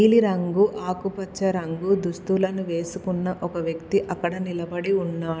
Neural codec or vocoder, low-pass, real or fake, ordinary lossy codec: none; none; real; none